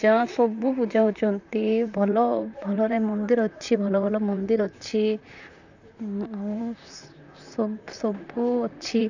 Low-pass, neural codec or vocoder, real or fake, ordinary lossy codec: 7.2 kHz; vocoder, 44.1 kHz, 128 mel bands, Pupu-Vocoder; fake; none